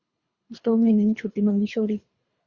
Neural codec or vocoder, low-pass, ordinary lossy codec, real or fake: codec, 24 kHz, 3 kbps, HILCodec; 7.2 kHz; Opus, 64 kbps; fake